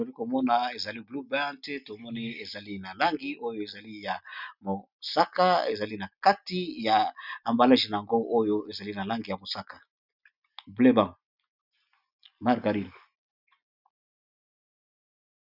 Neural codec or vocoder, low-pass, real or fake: none; 5.4 kHz; real